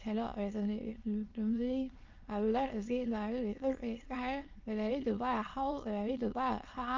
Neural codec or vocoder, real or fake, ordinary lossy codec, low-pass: autoencoder, 22.05 kHz, a latent of 192 numbers a frame, VITS, trained on many speakers; fake; Opus, 24 kbps; 7.2 kHz